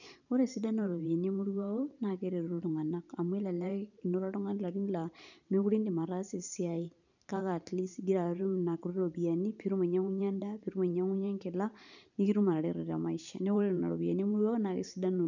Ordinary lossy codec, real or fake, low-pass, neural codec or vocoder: none; fake; 7.2 kHz; vocoder, 44.1 kHz, 128 mel bands every 512 samples, BigVGAN v2